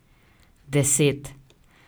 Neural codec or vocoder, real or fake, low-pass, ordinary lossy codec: none; real; none; none